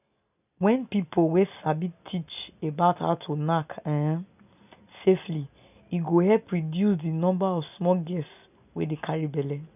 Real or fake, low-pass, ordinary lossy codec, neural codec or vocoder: real; 3.6 kHz; none; none